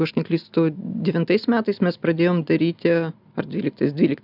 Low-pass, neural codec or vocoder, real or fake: 5.4 kHz; none; real